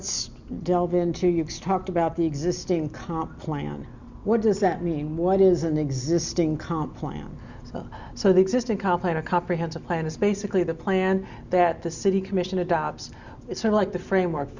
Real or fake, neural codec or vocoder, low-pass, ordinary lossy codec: real; none; 7.2 kHz; Opus, 64 kbps